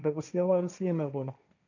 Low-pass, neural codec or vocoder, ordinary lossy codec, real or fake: 7.2 kHz; codec, 16 kHz, 1.1 kbps, Voila-Tokenizer; none; fake